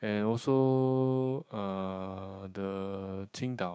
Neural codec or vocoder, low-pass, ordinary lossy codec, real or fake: codec, 16 kHz, 6 kbps, DAC; none; none; fake